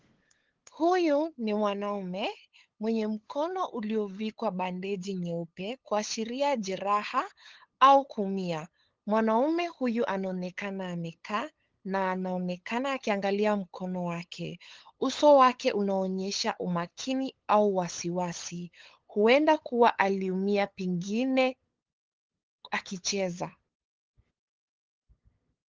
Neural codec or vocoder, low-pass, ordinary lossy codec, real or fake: codec, 16 kHz, 8 kbps, FunCodec, trained on LibriTTS, 25 frames a second; 7.2 kHz; Opus, 16 kbps; fake